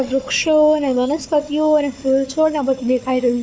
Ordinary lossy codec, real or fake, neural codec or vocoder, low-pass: none; fake; codec, 16 kHz, 4 kbps, FunCodec, trained on Chinese and English, 50 frames a second; none